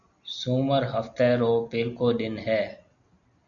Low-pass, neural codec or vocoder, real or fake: 7.2 kHz; none; real